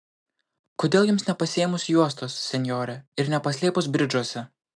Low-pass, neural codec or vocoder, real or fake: 9.9 kHz; none; real